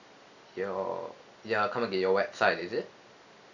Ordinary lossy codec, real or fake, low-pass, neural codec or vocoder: none; real; 7.2 kHz; none